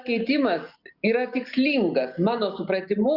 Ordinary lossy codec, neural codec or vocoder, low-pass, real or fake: Opus, 64 kbps; none; 5.4 kHz; real